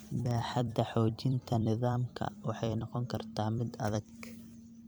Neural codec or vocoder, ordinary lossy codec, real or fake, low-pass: vocoder, 44.1 kHz, 128 mel bands every 256 samples, BigVGAN v2; none; fake; none